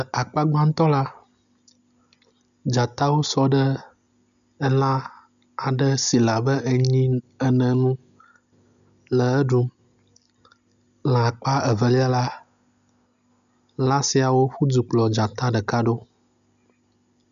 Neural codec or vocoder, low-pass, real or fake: none; 7.2 kHz; real